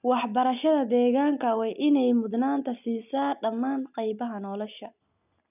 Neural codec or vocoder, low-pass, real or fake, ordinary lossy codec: none; 3.6 kHz; real; none